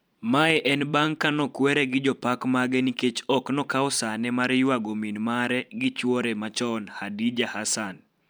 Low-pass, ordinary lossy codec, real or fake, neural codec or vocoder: 19.8 kHz; none; fake; vocoder, 44.1 kHz, 128 mel bands every 512 samples, BigVGAN v2